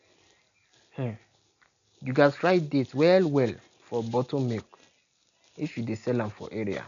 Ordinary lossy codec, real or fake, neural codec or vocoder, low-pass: none; real; none; 7.2 kHz